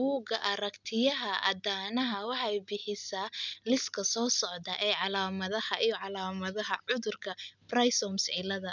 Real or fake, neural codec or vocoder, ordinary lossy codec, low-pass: real; none; none; 7.2 kHz